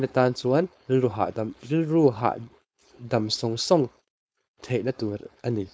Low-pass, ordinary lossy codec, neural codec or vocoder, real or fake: none; none; codec, 16 kHz, 4.8 kbps, FACodec; fake